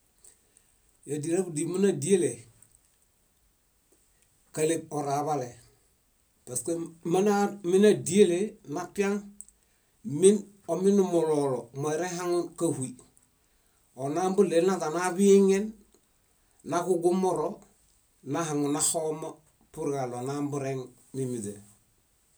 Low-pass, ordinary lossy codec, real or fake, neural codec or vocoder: none; none; real; none